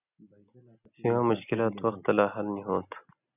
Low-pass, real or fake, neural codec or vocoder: 3.6 kHz; real; none